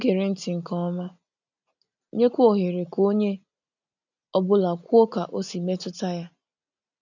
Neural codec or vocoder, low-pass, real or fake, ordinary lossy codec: none; 7.2 kHz; real; none